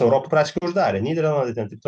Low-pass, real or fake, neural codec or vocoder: 9.9 kHz; real; none